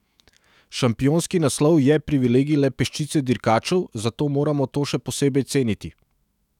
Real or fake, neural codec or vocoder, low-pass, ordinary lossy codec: fake; autoencoder, 48 kHz, 128 numbers a frame, DAC-VAE, trained on Japanese speech; 19.8 kHz; none